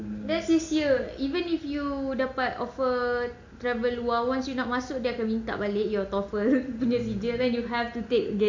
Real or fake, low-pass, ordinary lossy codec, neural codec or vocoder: real; 7.2 kHz; AAC, 48 kbps; none